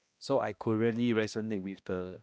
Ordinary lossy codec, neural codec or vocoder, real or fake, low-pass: none; codec, 16 kHz, 1 kbps, X-Codec, HuBERT features, trained on balanced general audio; fake; none